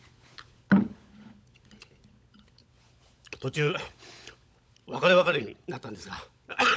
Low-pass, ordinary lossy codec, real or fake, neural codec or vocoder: none; none; fake; codec, 16 kHz, 16 kbps, FunCodec, trained on LibriTTS, 50 frames a second